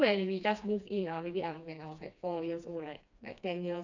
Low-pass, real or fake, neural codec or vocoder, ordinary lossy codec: 7.2 kHz; fake; codec, 16 kHz, 2 kbps, FreqCodec, smaller model; none